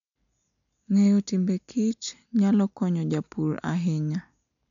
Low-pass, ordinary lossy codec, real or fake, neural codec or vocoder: 7.2 kHz; none; real; none